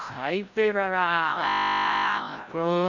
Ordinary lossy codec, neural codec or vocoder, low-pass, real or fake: none; codec, 16 kHz, 0.5 kbps, FreqCodec, larger model; 7.2 kHz; fake